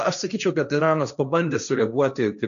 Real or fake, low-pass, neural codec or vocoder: fake; 7.2 kHz; codec, 16 kHz, 1.1 kbps, Voila-Tokenizer